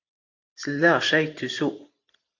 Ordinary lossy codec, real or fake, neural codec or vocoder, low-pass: AAC, 48 kbps; fake; vocoder, 44.1 kHz, 128 mel bands every 512 samples, BigVGAN v2; 7.2 kHz